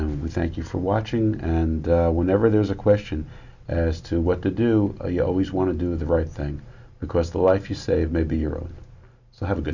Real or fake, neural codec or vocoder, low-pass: real; none; 7.2 kHz